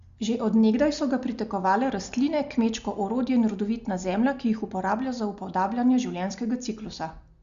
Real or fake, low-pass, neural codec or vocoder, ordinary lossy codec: real; 7.2 kHz; none; Opus, 64 kbps